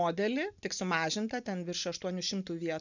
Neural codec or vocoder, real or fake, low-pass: none; real; 7.2 kHz